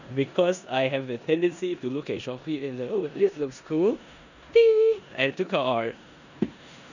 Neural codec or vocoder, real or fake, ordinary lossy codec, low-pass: codec, 16 kHz in and 24 kHz out, 0.9 kbps, LongCat-Audio-Codec, four codebook decoder; fake; none; 7.2 kHz